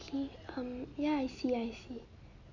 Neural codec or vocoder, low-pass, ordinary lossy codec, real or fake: none; 7.2 kHz; none; real